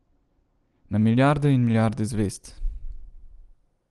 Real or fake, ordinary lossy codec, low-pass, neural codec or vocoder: real; Opus, 24 kbps; 10.8 kHz; none